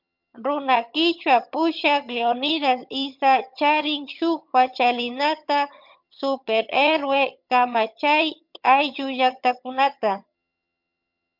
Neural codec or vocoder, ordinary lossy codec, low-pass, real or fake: vocoder, 22.05 kHz, 80 mel bands, HiFi-GAN; MP3, 48 kbps; 5.4 kHz; fake